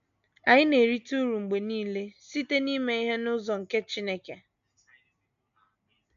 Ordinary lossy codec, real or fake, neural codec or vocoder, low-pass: none; real; none; 7.2 kHz